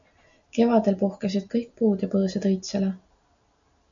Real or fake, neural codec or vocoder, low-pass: real; none; 7.2 kHz